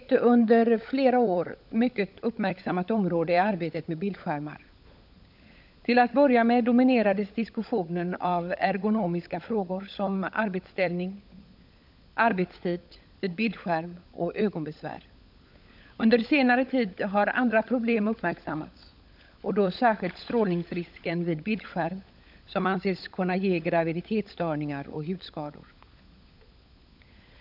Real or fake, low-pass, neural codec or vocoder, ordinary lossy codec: fake; 5.4 kHz; codec, 16 kHz, 16 kbps, FunCodec, trained on LibriTTS, 50 frames a second; none